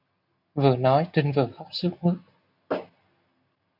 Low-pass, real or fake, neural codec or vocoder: 5.4 kHz; real; none